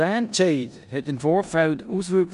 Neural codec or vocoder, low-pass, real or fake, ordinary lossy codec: codec, 16 kHz in and 24 kHz out, 0.9 kbps, LongCat-Audio-Codec, four codebook decoder; 10.8 kHz; fake; none